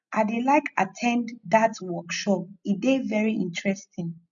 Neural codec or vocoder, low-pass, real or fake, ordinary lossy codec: none; 7.2 kHz; real; none